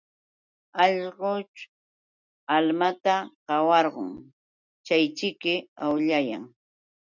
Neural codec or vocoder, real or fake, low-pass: none; real; 7.2 kHz